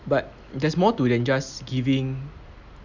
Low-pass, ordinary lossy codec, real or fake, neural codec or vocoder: 7.2 kHz; none; real; none